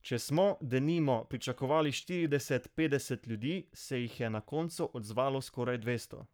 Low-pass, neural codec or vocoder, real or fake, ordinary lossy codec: none; codec, 44.1 kHz, 7.8 kbps, Pupu-Codec; fake; none